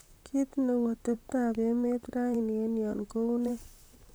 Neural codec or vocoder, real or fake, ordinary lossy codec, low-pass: vocoder, 44.1 kHz, 128 mel bands, Pupu-Vocoder; fake; none; none